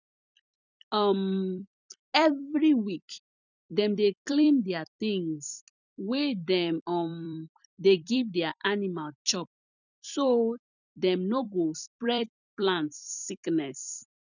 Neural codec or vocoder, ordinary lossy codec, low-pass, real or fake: vocoder, 44.1 kHz, 128 mel bands every 256 samples, BigVGAN v2; none; 7.2 kHz; fake